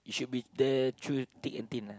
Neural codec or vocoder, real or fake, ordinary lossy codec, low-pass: none; real; none; none